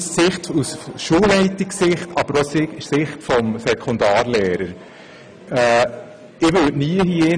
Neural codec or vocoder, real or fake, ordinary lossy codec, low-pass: none; real; none; 9.9 kHz